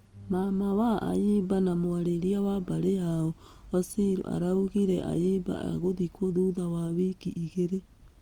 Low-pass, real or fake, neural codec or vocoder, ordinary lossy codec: 19.8 kHz; real; none; Opus, 24 kbps